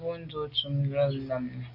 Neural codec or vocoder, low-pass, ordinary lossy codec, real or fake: none; 5.4 kHz; AAC, 32 kbps; real